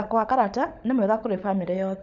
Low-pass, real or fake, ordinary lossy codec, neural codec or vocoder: 7.2 kHz; fake; none; codec, 16 kHz, 16 kbps, FunCodec, trained on LibriTTS, 50 frames a second